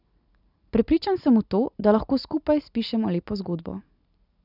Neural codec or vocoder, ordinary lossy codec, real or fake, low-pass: none; none; real; 5.4 kHz